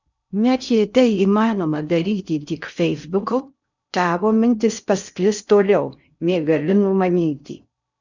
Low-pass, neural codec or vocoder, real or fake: 7.2 kHz; codec, 16 kHz in and 24 kHz out, 0.6 kbps, FocalCodec, streaming, 2048 codes; fake